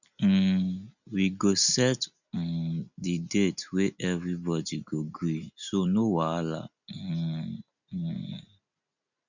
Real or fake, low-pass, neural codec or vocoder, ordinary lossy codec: real; 7.2 kHz; none; none